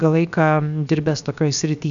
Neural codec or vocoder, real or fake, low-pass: codec, 16 kHz, 0.7 kbps, FocalCodec; fake; 7.2 kHz